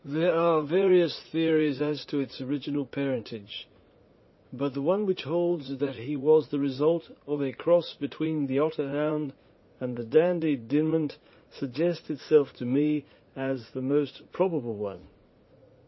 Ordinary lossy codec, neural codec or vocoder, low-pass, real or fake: MP3, 24 kbps; vocoder, 44.1 kHz, 80 mel bands, Vocos; 7.2 kHz; fake